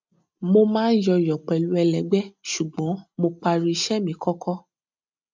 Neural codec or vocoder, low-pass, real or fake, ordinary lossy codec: none; 7.2 kHz; real; MP3, 64 kbps